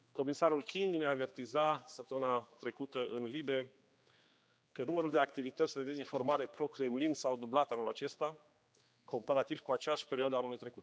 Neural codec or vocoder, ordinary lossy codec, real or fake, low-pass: codec, 16 kHz, 2 kbps, X-Codec, HuBERT features, trained on general audio; none; fake; none